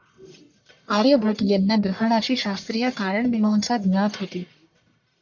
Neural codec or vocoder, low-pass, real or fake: codec, 44.1 kHz, 1.7 kbps, Pupu-Codec; 7.2 kHz; fake